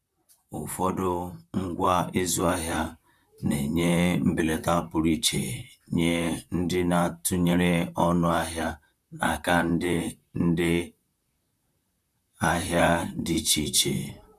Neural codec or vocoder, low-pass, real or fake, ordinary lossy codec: vocoder, 44.1 kHz, 128 mel bands, Pupu-Vocoder; 14.4 kHz; fake; none